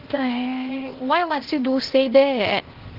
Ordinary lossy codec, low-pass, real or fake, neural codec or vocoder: Opus, 16 kbps; 5.4 kHz; fake; codec, 16 kHz, 0.8 kbps, ZipCodec